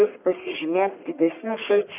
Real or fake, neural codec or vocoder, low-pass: fake; codec, 44.1 kHz, 1.7 kbps, Pupu-Codec; 3.6 kHz